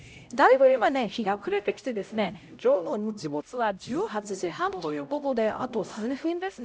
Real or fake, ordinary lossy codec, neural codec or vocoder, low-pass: fake; none; codec, 16 kHz, 0.5 kbps, X-Codec, HuBERT features, trained on LibriSpeech; none